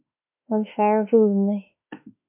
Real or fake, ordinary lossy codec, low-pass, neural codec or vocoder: fake; MP3, 32 kbps; 3.6 kHz; autoencoder, 48 kHz, 32 numbers a frame, DAC-VAE, trained on Japanese speech